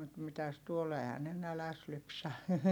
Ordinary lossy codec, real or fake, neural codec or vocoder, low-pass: none; real; none; 19.8 kHz